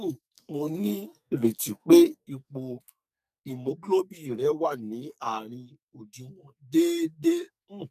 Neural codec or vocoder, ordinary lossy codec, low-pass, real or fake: codec, 44.1 kHz, 2.6 kbps, SNAC; none; 14.4 kHz; fake